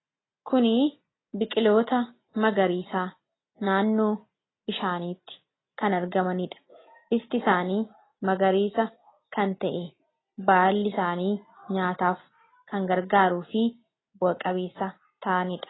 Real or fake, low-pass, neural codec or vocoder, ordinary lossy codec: real; 7.2 kHz; none; AAC, 16 kbps